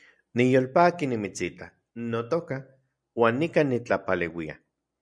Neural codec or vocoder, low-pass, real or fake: none; 9.9 kHz; real